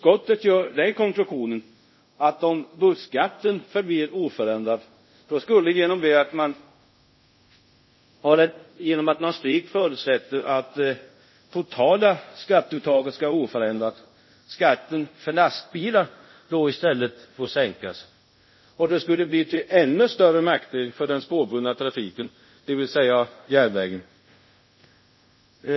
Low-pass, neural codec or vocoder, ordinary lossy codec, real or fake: 7.2 kHz; codec, 24 kHz, 0.5 kbps, DualCodec; MP3, 24 kbps; fake